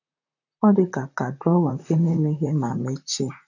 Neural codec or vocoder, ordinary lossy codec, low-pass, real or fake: vocoder, 44.1 kHz, 128 mel bands, Pupu-Vocoder; none; 7.2 kHz; fake